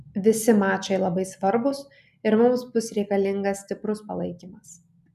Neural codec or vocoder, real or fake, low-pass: none; real; 14.4 kHz